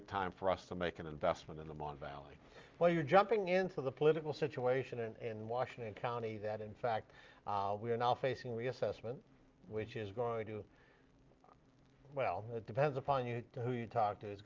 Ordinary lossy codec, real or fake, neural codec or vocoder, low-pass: Opus, 32 kbps; real; none; 7.2 kHz